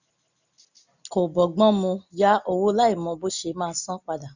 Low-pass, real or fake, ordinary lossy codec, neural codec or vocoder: 7.2 kHz; real; none; none